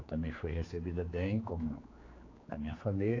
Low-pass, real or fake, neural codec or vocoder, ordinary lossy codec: 7.2 kHz; fake; codec, 16 kHz, 4 kbps, X-Codec, HuBERT features, trained on general audio; AAC, 32 kbps